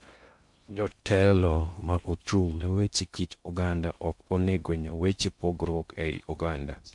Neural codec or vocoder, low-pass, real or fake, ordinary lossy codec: codec, 16 kHz in and 24 kHz out, 0.6 kbps, FocalCodec, streaming, 2048 codes; 10.8 kHz; fake; MP3, 64 kbps